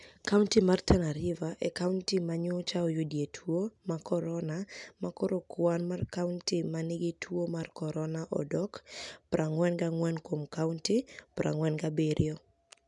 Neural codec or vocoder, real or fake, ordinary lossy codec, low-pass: none; real; none; 10.8 kHz